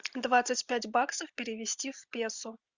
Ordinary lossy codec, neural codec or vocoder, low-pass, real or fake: Opus, 64 kbps; none; 7.2 kHz; real